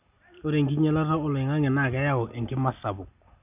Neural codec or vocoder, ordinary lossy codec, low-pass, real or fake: none; none; 3.6 kHz; real